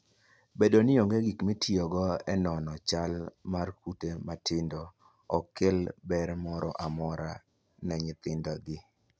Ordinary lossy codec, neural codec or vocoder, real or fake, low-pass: none; none; real; none